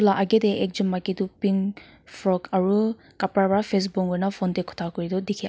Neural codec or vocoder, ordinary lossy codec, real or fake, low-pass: none; none; real; none